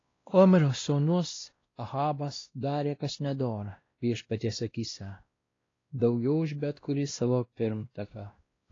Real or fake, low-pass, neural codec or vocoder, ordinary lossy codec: fake; 7.2 kHz; codec, 16 kHz, 1 kbps, X-Codec, WavLM features, trained on Multilingual LibriSpeech; AAC, 32 kbps